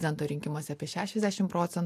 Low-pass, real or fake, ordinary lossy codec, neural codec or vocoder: 14.4 kHz; real; AAC, 64 kbps; none